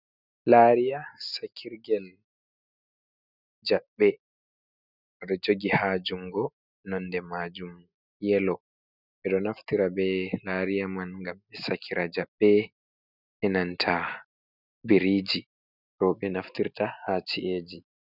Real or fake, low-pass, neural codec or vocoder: real; 5.4 kHz; none